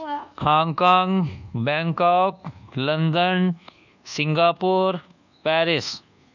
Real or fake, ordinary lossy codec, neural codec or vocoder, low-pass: fake; none; codec, 24 kHz, 1.2 kbps, DualCodec; 7.2 kHz